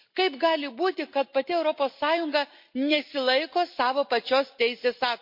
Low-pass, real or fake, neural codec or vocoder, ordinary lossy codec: 5.4 kHz; real; none; MP3, 32 kbps